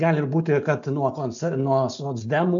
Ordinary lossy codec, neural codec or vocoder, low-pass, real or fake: AAC, 64 kbps; none; 7.2 kHz; real